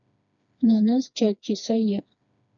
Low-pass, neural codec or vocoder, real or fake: 7.2 kHz; codec, 16 kHz, 2 kbps, FreqCodec, smaller model; fake